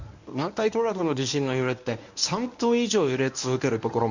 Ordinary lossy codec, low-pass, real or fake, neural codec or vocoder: none; 7.2 kHz; fake; codec, 24 kHz, 0.9 kbps, WavTokenizer, medium speech release version 1